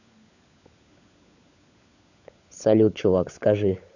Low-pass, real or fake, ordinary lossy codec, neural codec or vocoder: 7.2 kHz; fake; none; codec, 16 kHz, 16 kbps, FunCodec, trained on LibriTTS, 50 frames a second